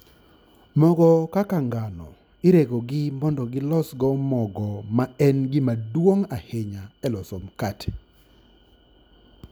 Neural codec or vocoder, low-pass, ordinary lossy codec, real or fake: none; none; none; real